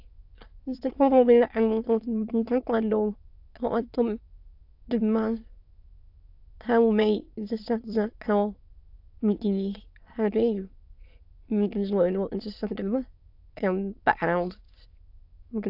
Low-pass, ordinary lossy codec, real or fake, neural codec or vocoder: 5.4 kHz; AAC, 48 kbps; fake; autoencoder, 22.05 kHz, a latent of 192 numbers a frame, VITS, trained on many speakers